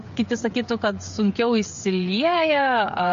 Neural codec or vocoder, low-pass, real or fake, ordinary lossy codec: codec, 16 kHz, 8 kbps, FreqCodec, smaller model; 7.2 kHz; fake; MP3, 48 kbps